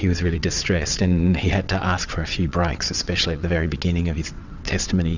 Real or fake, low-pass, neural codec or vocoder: fake; 7.2 kHz; vocoder, 22.05 kHz, 80 mel bands, WaveNeXt